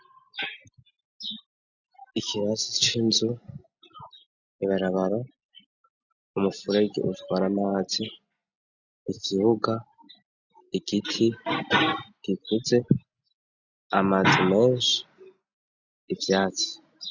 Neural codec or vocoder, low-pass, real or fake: none; 7.2 kHz; real